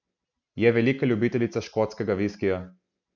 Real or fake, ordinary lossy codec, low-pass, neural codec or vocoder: real; none; 7.2 kHz; none